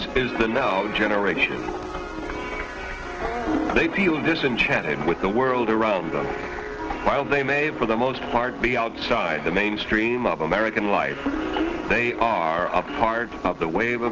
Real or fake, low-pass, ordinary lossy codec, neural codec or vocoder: real; 7.2 kHz; Opus, 16 kbps; none